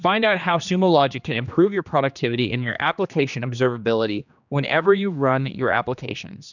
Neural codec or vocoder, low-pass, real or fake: codec, 16 kHz, 2 kbps, X-Codec, HuBERT features, trained on general audio; 7.2 kHz; fake